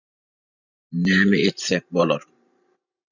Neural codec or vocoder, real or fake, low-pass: vocoder, 44.1 kHz, 128 mel bands every 512 samples, BigVGAN v2; fake; 7.2 kHz